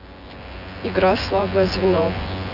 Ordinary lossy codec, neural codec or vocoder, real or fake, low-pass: AAC, 48 kbps; vocoder, 24 kHz, 100 mel bands, Vocos; fake; 5.4 kHz